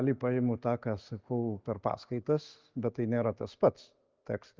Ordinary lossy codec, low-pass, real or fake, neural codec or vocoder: Opus, 32 kbps; 7.2 kHz; real; none